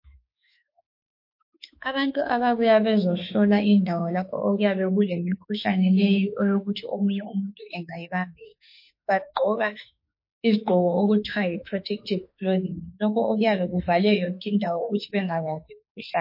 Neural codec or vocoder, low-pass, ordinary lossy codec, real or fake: autoencoder, 48 kHz, 32 numbers a frame, DAC-VAE, trained on Japanese speech; 5.4 kHz; MP3, 32 kbps; fake